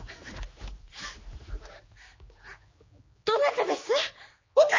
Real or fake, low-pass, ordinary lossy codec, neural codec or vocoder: fake; 7.2 kHz; MP3, 32 kbps; codec, 16 kHz, 2 kbps, X-Codec, HuBERT features, trained on general audio